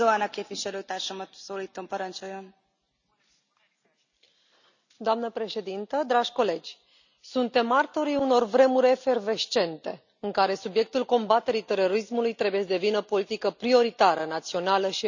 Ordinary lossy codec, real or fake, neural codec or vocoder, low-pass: none; real; none; 7.2 kHz